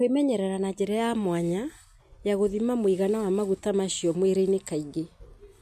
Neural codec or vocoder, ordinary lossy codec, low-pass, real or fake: none; MP3, 64 kbps; 14.4 kHz; real